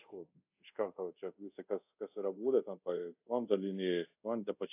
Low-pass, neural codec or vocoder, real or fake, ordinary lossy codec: 3.6 kHz; codec, 24 kHz, 0.5 kbps, DualCodec; fake; AAC, 32 kbps